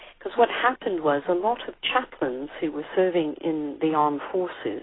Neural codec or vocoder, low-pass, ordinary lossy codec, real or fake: none; 7.2 kHz; AAC, 16 kbps; real